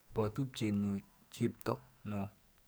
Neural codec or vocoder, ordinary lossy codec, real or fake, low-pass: codec, 44.1 kHz, 2.6 kbps, SNAC; none; fake; none